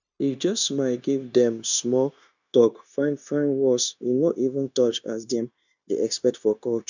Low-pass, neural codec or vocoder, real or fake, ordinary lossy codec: 7.2 kHz; codec, 16 kHz, 0.9 kbps, LongCat-Audio-Codec; fake; none